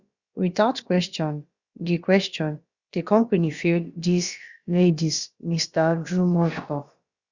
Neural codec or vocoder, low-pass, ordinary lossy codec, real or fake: codec, 16 kHz, about 1 kbps, DyCAST, with the encoder's durations; 7.2 kHz; Opus, 64 kbps; fake